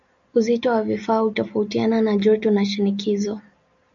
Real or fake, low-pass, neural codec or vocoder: real; 7.2 kHz; none